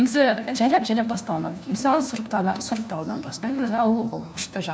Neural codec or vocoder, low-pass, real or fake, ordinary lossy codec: codec, 16 kHz, 1 kbps, FunCodec, trained on LibriTTS, 50 frames a second; none; fake; none